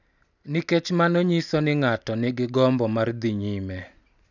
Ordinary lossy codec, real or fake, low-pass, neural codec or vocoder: none; real; 7.2 kHz; none